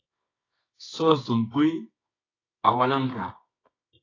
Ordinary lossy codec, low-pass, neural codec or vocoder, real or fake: AAC, 32 kbps; 7.2 kHz; codec, 24 kHz, 0.9 kbps, WavTokenizer, medium music audio release; fake